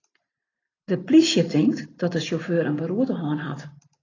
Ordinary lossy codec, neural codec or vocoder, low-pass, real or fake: AAC, 32 kbps; none; 7.2 kHz; real